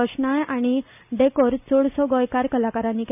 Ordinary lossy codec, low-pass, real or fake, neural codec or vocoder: none; 3.6 kHz; real; none